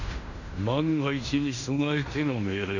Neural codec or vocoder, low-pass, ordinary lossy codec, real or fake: codec, 16 kHz in and 24 kHz out, 0.9 kbps, LongCat-Audio-Codec, fine tuned four codebook decoder; 7.2 kHz; none; fake